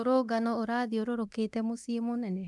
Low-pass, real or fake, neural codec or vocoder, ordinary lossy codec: none; fake; codec, 24 kHz, 0.9 kbps, DualCodec; none